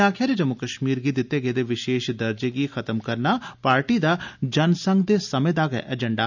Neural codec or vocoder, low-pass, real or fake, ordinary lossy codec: none; 7.2 kHz; real; none